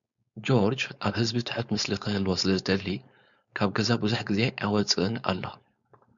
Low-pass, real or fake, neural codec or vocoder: 7.2 kHz; fake; codec, 16 kHz, 4.8 kbps, FACodec